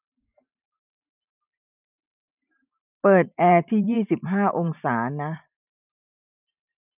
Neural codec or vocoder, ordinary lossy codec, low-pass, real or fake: vocoder, 44.1 kHz, 128 mel bands every 512 samples, BigVGAN v2; none; 3.6 kHz; fake